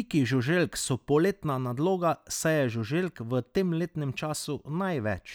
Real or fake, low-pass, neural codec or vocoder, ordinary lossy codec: real; none; none; none